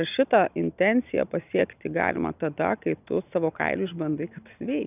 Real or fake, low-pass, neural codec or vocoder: real; 3.6 kHz; none